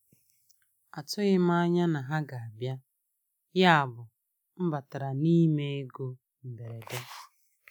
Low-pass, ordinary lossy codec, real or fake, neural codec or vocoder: none; none; real; none